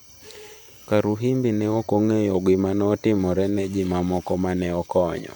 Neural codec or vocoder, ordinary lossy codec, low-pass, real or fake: vocoder, 44.1 kHz, 128 mel bands every 512 samples, BigVGAN v2; none; none; fake